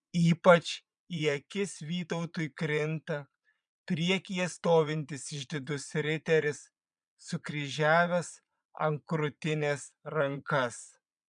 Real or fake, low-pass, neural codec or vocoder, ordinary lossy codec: fake; 9.9 kHz; vocoder, 22.05 kHz, 80 mel bands, Vocos; MP3, 96 kbps